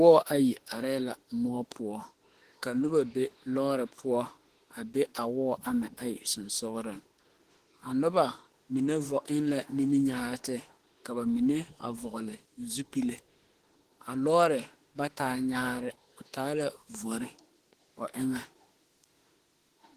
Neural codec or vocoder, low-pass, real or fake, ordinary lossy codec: autoencoder, 48 kHz, 32 numbers a frame, DAC-VAE, trained on Japanese speech; 14.4 kHz; fake; Opus, 16 kbps